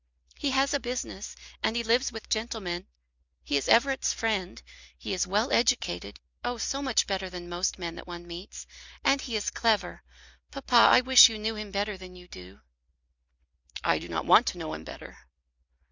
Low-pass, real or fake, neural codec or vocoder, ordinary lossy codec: 7.2 kHz; real; none; Opus, 64 kbps